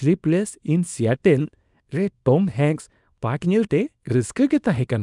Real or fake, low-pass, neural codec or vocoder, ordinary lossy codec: fake; 10.8 kHz; codec, 24 kHz, 0.9 kbps, WavTokenizer, small release; none